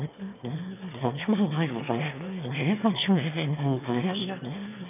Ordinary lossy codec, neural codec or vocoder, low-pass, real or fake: none; autoencoder, 22.05 kHz, a latent of 192 numbers a frame, VITS, trained on one speaker; 3.6 kHz; fake